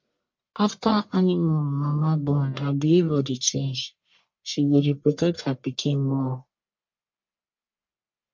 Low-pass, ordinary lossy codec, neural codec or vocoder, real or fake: 7.2 kHz; MP3, 48 kbps; codec, 44.1 kHz, 1.7 kbps, Pupu-Codec; fake